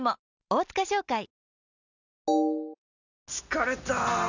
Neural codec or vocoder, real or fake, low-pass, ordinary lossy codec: none; real; 7.2 kHz; none